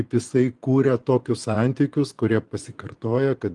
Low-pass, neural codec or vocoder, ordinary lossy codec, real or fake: 10.8 kHz; vocoder, 24 kHz, 100 mel bands, Vocos; Opus, 24 kbps; fake